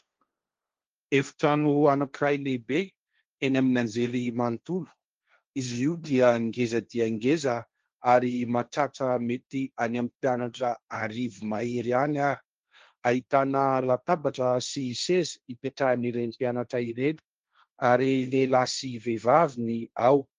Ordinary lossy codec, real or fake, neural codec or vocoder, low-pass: Opus, 32 kbps; fake; codec, 16 kHz, 1.1 kbps, Voila-Tokenizer; 7.2 kHz